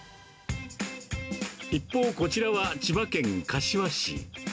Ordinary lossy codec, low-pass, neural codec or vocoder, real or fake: none; none; none; real